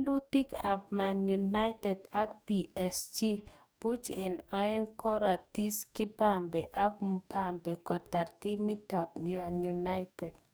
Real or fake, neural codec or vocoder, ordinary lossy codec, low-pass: fake; codec, 44.1 kHz, 2.6 kbps, DAC; none; none